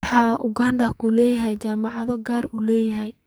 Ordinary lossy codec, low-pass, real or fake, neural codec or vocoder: none; none; fake; codec, 44.1 kHz, 2.6 kbps, SNAC